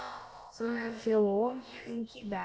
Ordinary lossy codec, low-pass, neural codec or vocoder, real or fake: none; none; codec, 16 kHz, about 1 kbps, DyCAST, with the encoder's durations; fake